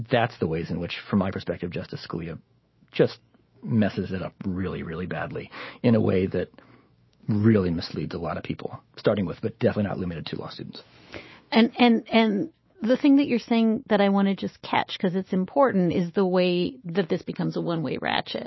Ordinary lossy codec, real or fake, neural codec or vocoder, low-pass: MP3, 24 kbps; fake; autoencoder, 48 kHz, 128 numbers a frame, DAC-VAE, trained on Japanese speech; 7.2 kHz